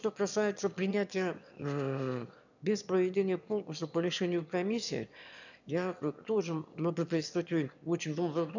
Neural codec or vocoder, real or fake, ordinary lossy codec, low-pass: autoencoder, 22.05 kHz, a latent of 192 numbers a frame, VITS, trained on one speaker; fake; none; 7.2 kHz